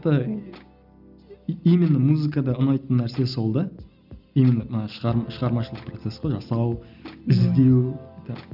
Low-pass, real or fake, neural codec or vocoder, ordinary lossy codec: 5.4 kHz; real; none; none